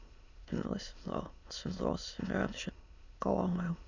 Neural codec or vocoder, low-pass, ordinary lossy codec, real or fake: autoencoder, 22.05 kHz, a latent of 192 numbers a frame, VITS, trained on many speakers; 7.2 kHz; Opus, 64 kbps; fake